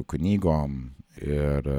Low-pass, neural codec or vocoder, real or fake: 19.8 kHz; none; real